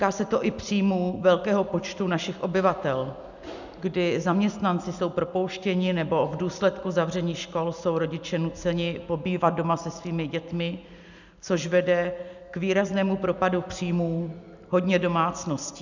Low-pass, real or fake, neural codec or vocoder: 7.2 kHz; real; none